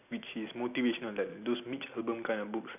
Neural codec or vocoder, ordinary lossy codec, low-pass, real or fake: none; none; 3.6 kHz; real